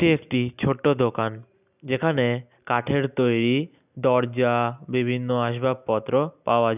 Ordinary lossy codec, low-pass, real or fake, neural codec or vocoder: none; 3.6 kHz; real; none